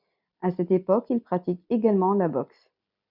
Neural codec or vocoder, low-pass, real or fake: none; 5.4 kHz; real